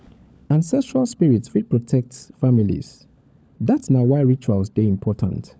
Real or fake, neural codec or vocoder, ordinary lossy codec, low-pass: fake; codec, 16 kHz, 16 kbps, FunCodec, trained on LibriTTS, 50 frames a second; none; none